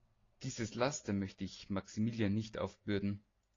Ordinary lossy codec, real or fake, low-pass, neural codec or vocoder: AAC, 32 kbps; real; 7.2 kHz; none